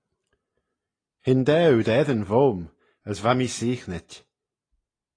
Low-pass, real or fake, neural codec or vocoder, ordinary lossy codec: 9.9 kHz; real; none; AAC, 32 kbps